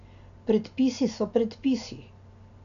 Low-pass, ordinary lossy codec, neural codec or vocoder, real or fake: 7.2 kHz; none; none; real